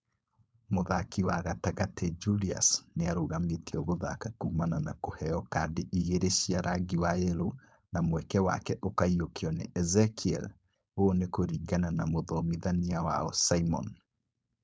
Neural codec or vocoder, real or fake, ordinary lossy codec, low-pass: codec, 16 kHz, 4.8 kbps, FACodec; fake; none; none